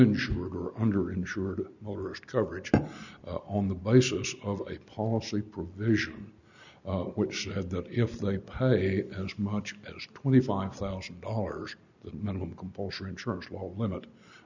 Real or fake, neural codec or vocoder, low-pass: real; none; 7.2 kHz